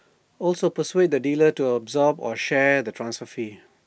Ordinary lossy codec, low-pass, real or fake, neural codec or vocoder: none; none; real; none